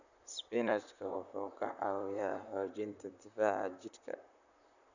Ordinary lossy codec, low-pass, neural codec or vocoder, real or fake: none; 7.2 kHz; vocoder, 44.1 kHz, 128 mel bands, Pupu-Vocoder; fake